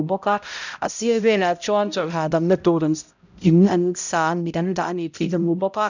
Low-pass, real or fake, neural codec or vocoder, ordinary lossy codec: 7.2 kHz; fake; codec, 16 kHz, 0.5 kbps, X-Codec, HuBERT features, trained on balanced general audio; none